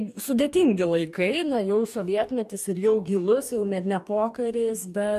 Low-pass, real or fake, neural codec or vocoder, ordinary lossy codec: 14.4 kHz; fake; codec, 44.1 kHz, 2.6 kbps, DAC; AAC, 96 kbps